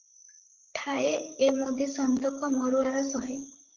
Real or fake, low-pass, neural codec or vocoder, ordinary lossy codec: fake; 7.2 kHz; codec, 16 kHz, 8 kbps, FreqCodec, larger model; Opus, 16 kbps